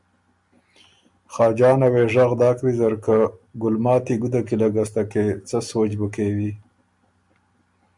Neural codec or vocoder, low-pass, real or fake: none; 10.8 kHz; real